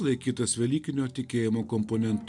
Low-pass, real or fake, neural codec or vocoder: 10.8 kHz; real; none